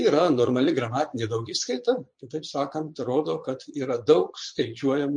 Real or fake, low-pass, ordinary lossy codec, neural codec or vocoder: fake; 9.9 kHz; MP3, 48 kbps; vocoder, 22.05 kHz, 80 mel bands, Vocos